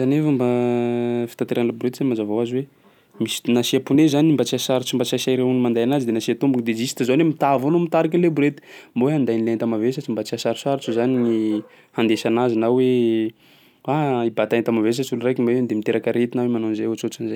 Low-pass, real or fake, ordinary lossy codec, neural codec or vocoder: 19.8 kHz; real; none; none